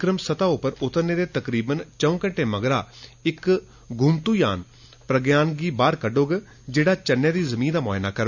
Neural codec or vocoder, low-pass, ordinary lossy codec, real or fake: none; 7.2 kHz; none; real